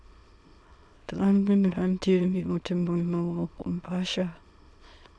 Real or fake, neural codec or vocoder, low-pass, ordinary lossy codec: fake; autoencoder, 22.05 kHz, a latent of 192 numbers a frame, VITS, trained on many speakers; none; none